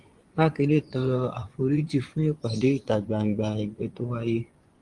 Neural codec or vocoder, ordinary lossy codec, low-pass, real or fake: vocoder, 24 kHz, 100 mel bands, Vocos; Opus, 24 kbps; 10.8 kHz; fake